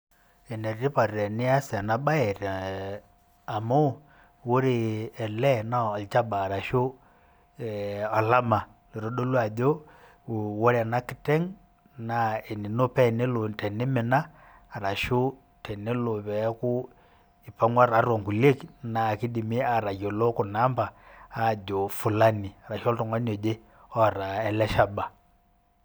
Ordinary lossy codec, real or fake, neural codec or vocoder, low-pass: none; real; none; none